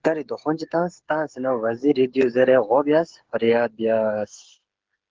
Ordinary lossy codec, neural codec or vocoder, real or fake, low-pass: Opus, 16 kbps; codec, 16 kHz, 8 kbps, FreqCodec, smaller model; fake; 7.2 kHz